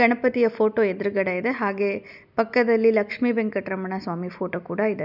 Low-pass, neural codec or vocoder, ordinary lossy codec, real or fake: 5.4 kHz; none; none; real